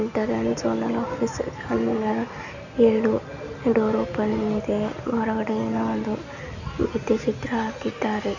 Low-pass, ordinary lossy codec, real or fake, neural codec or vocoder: 7.2 kHz; none; real; none